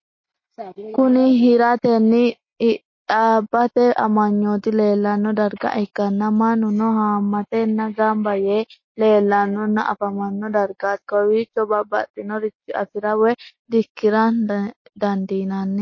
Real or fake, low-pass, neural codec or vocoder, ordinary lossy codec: real; 7.2 kHz; none; MP3, 32 kbps